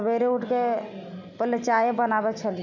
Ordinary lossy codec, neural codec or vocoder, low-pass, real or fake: none; none; 7.2 kHz; real